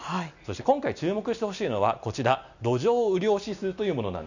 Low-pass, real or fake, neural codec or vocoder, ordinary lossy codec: 7.2 kHz; real; none; none